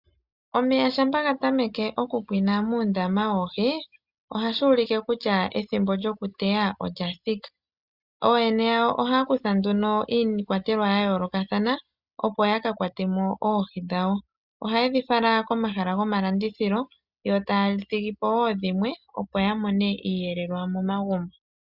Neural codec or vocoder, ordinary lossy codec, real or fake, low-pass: none; Opus, 64 kbps; real; 5.4 kHz